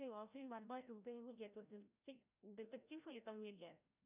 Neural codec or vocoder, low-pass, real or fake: codec, 16 kHz, 0.5 kbps, FreqCodec, larger model; 3.6 kHz; fake